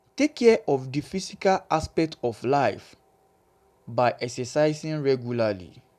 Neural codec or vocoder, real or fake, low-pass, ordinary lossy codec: none; real; 14.4 kHz; none